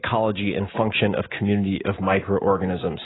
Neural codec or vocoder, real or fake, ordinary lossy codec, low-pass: none; real; AAC, 16 kbps; 7.2 kHz